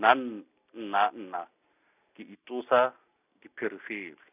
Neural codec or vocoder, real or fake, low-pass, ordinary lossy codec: none; real; 3.6 kHz; none